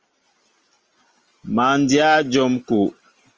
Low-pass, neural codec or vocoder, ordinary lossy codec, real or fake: 7.2 kHz; none; Opus, 24 kbps; real